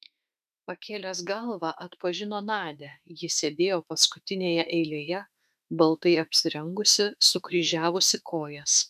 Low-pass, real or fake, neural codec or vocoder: 14.4 kHz; fake; autoencoder, 48 kHz, 32 numbers a frame, DAC-VAE, trained on Japanese speech